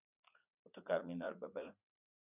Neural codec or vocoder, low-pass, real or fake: vocoder, 44.1 kHz, 80 mel bands, Vocos; 3.6 kHz; fake